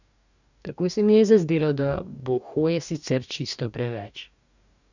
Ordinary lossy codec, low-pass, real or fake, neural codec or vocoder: none; 7.2 kHz; fake; codec, 44.1 kHz, 2.6 kbps, DAC